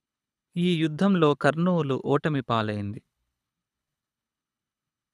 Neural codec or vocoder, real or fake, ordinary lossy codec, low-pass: codec, 24 kHz, 6 kbps, HILCodec; fake; none; none